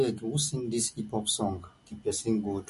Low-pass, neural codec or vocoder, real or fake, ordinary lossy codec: 14.4 kHz; none; real; MP3, 48 kbps